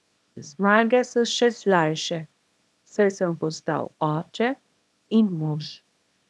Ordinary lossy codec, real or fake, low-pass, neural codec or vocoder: none; fake; none; codec, 24 kHz, 0.9 kbps, WavTokenizer, small release